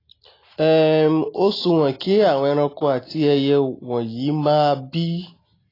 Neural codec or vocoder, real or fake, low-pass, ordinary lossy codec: none; real; 5.4 kHz; AAC, 24 kbps